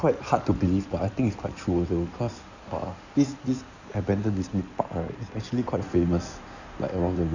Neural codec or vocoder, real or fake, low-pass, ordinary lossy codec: codec, 16 kHz in and 24 kHz out, 2.2 kbps, FireRedTTS-2 codec; fake; 7.2 kHz; none